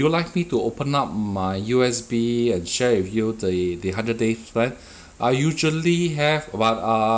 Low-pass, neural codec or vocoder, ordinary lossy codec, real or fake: none; none; none; real